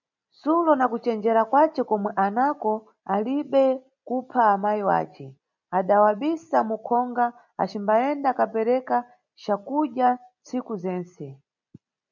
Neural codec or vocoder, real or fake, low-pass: none; real; 7.2 kHz